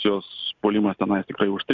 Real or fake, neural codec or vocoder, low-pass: real; none; 7.2 kHz